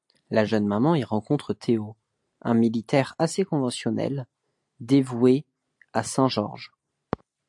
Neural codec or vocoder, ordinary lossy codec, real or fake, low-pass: none; AAC, 64 kbps; real; 10.8 kHz